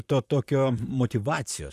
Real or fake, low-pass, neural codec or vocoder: fake; 14.4 kHz; vocoder, 44.1 kHz, 128 mel bands, Pupu-Vocoder